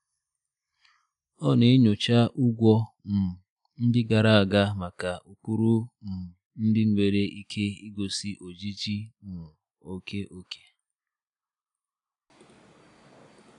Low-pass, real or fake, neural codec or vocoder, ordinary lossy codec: 10.8 kHz; real; none; none